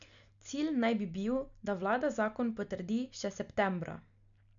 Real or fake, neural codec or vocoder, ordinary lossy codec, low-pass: real; none; none; 7.2 kHz